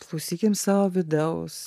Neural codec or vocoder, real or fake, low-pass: vocoder, 44.1 kHz, 128 mel bands every 512 samples, BigVGAN v2; fake; 14.4 kHz